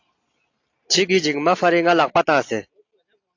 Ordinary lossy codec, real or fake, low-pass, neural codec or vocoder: AAC, 48 kbps; real; 7.2 kHz; none